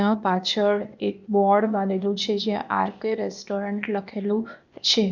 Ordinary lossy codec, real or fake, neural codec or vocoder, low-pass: none; fake; codec, 16 kHz, 0.8 kbps, ZipCodec; 7.2 kHz